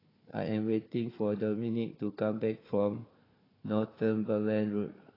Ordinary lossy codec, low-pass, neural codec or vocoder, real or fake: AAC, 24 kbps; 5.4 kHz; codec, 16 kHz, 4 kbps, FunCodec, trained on Chinese and English, 50 frames a second; fake